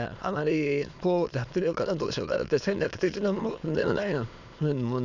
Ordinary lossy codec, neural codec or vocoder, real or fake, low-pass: none; autoencoder, 22.05 kHz, a latent of 192 numbers a frame, VITS, trained on many speakers; fake; 7.2 kHz